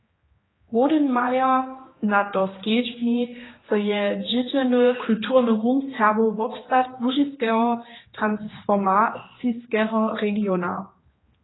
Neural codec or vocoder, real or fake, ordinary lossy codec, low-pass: codec, 16 kHz, 2 kbps, X-Codec, HuBERT features, trained on general audio; fake; AAC, 16 kbps; 7.2 kHz